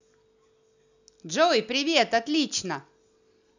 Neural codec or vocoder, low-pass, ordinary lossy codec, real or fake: none; 7.2 kHz; none; real